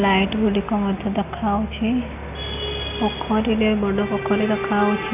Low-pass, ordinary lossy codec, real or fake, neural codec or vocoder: 3.6 kHz; none; real; none